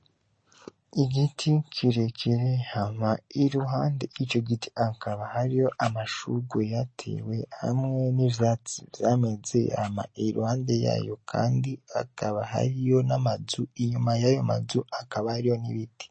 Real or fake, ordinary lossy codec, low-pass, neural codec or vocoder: real; MP3, 32 kbps; 9.9 kHz; none